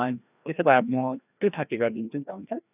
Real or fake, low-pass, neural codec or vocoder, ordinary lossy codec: fake; 3.6 kHz; codec, 16 kHz, 1 kbps, FreqCodec, larger model; none